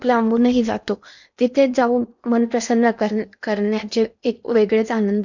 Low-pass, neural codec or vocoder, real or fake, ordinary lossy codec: 7.2 kHz; codec, 16 kHz in and 24 kHz out, 0.8 kbps, FocalCodec, streaming, 65536 codes; fake; none